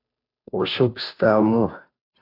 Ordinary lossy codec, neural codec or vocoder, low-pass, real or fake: AAC, 24 kbps; codec, 16 kHz, 0.5 kbps, FunCodec, trained on Chinese and English, 25 frames a second; 5.4 kHz; fake